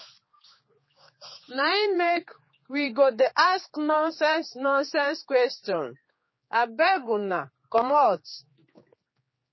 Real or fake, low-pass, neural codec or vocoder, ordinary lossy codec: fake; 7.2 kHz; codec, 16 kHz, 4 kbps, X-Codec, HuBERT features, trained on general audio; MP3, 24 kbps